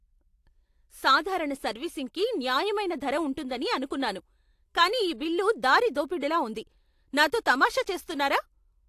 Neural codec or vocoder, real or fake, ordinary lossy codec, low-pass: none; real; AAC, 64 kbps; 14.4 kHz